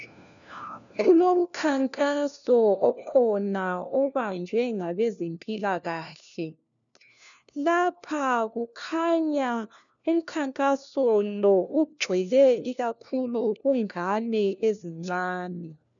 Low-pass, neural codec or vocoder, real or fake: 7.2 kHz; codec, 16 kHz, 1 kbps, FunCodec, trained on LibriTTS, 50 frames a second; fake